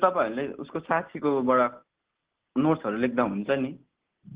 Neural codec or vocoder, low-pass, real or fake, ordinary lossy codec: none; 3.6 kHz; real; Opus, 16 kbps